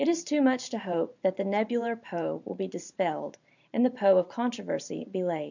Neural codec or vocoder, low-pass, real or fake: vocoder, 44.1 kHz, 128 mel bands every 512 samples, BigVGAN v2; 7.2 kHz; fake